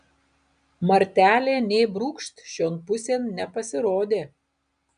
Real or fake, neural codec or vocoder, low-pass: real; none; 9.9 kHz